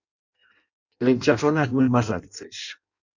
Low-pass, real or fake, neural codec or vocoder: 7.2 kHz; fake; codec, 16 kHz in and 24 kHz out, 0.6 kbps, FireRedTTS-2 codec